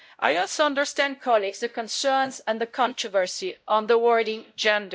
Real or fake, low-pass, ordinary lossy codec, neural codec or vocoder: fake; none; none; codec, 16 kHz, 0.5 kbps, X-Codec, WavLM features, trained on Multilingual LibriSpeech